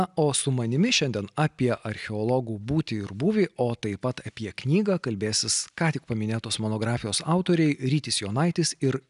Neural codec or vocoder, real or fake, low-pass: none; real; 10.8 kHz